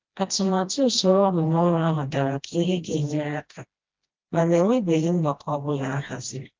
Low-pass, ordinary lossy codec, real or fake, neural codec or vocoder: 7.2 kHz; Opus, 24 kbps; fake; codec, 16 kHz, 1 kbps, FreqCodec, smaller model